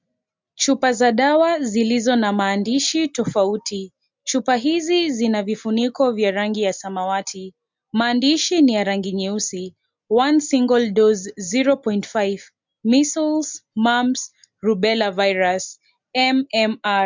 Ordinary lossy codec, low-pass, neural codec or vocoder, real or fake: MP3, 64 kbps; 7.2 kHz; none; real